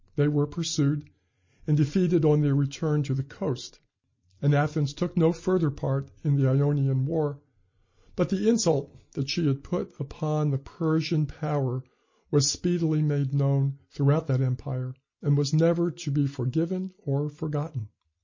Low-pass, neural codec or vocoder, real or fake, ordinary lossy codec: 7.2 kHz; none; real; MP3, 32 kbps